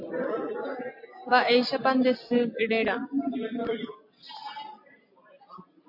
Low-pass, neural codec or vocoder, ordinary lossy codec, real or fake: 5.4 kHz; none; MP3, 32 kbps; real